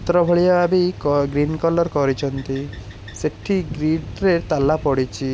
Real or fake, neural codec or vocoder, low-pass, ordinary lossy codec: real; none; none; none